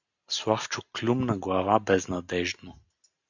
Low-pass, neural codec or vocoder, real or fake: 7.2 kHz; none; real